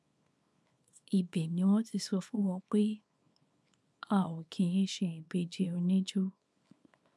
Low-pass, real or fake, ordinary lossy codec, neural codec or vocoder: none; fake; none; codec, 24 kHz, 0.9 kbps, WavTokenizer, small release